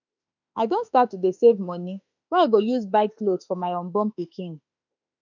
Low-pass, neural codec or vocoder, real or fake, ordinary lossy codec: 7.2 kHz; autoencoder, 48 kHz, 32 numbers a frame, DAC-VAE, trained on Japanese speech; fake; none